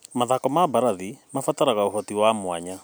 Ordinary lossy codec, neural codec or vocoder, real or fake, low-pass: none; none; real; none